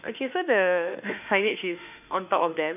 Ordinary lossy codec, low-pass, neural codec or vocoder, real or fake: none; 3.6 kHz; autoencoder, 48 kHz, 32 numbers a frame, DAC-VAE, trained on Japanese speech; fake